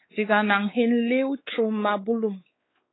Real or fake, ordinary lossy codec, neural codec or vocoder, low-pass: fake; AAC, 16 kbps; codec, 16 kHz, 4 kbps, X-Codec, WavLM features, trained on Multilingual LibriSpeech; 7.2 kHz